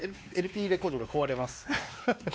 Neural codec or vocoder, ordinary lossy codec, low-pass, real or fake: codec, 16 kHz, 2 kbps, X-Codec, WavLM features, trained on Multilingual LibriSpeech; none; none; fake